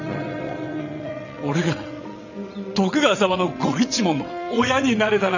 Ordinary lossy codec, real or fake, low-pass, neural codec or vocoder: none; fake; 7.2 kHz; vocoder, 22.05 kHz, 80 mel bands, WaveNeXt